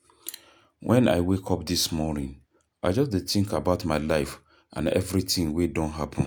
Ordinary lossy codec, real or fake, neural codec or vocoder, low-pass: none; real; none; none